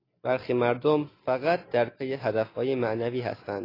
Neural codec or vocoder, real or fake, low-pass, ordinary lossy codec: none; real; 5.4 kHz; AAC, 24 kbps